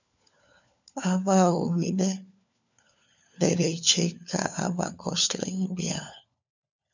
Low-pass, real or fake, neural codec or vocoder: 7.2 kHz; fake; codec, 16 kHz, 4 kbps, FunCodec, trained on LibriTTS, 50 frames a second